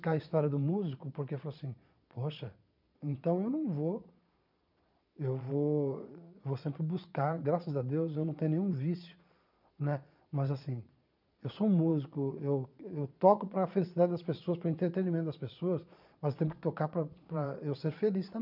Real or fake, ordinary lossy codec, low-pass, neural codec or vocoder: fake; none; 5.4 kHz; vocoder, 22.05 kHz, 80 mel bands, Vocos